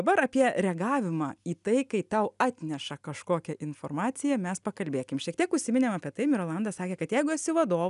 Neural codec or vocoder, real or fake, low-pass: none; real; 10.8 kHz